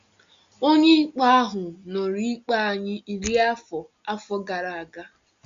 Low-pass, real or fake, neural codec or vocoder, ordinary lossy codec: 7.2 kHz; real; none; Opus, 64 kbps